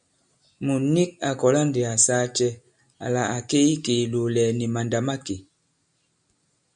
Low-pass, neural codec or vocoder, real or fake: 9.9 kHz; none; real